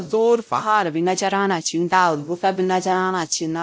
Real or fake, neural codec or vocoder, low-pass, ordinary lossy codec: fake; codec, 16 kHz, 0.5 kbps, X-Codec, WavLM features, trained on Multilingual LibriSpeech; none; none